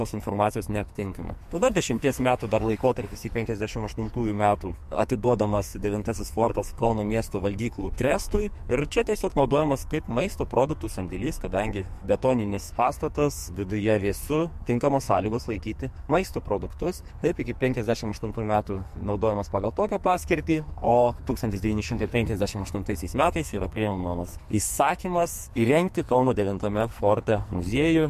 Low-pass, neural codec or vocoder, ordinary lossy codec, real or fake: 14.4 kHz; codec, 44.1 kHz, 2.6 kbps, SNAC; MP3, 64 kbps; fake